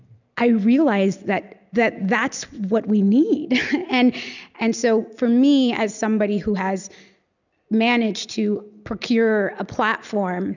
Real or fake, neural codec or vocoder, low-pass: real; none; 7.2 kHz